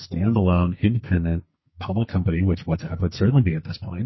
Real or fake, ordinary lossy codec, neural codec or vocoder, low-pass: fake; MP3, 24 kbps; codec, 44.1 kHz, 2.6 kbps, SNAC; 7.2 kHz